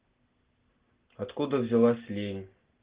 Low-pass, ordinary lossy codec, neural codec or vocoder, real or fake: 3.6 kHz; Opus, 16 kbps; none; real